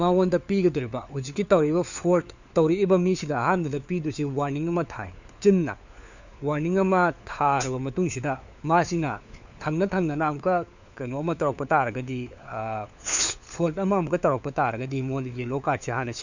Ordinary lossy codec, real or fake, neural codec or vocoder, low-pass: none; fake; codec, 16 kHz, 4 kbps, FunCodec, trained on LibriTTS, 50 frames a second; 7.2 kHz